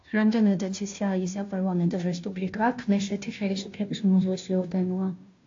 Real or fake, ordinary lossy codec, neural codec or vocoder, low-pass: fake; AAC, 48 kbps; codec, 16 kHz, 0.5 kbps, FunCodec, trained on Chinese and English, 25 frames a second; 7.2 kHz